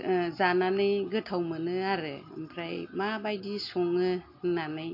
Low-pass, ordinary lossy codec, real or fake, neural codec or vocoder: 5.4 kHz; MP3, 32 kbps; real; none